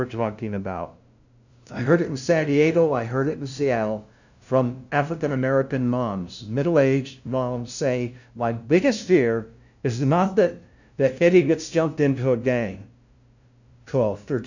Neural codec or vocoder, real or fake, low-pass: codec, 16 kHz, 0.5 kbps, FunCodec, trained on LibriTTS, 25 frames a second; fake; 7.2 kHz